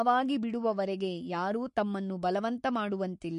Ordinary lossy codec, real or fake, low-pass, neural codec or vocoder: MP3, 48 kbps; fake; 14.4 kHz; codec, 44.1 kHz, 7.8 kbps, Pupu-Codec